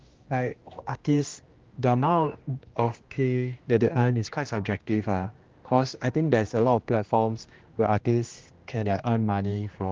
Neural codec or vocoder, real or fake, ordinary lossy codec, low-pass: codec, 16 kHz, 1 kbps, X-Codec, HuBERT features, trained on general audio; fake; Opus, 32 kbps; 7.2 kHz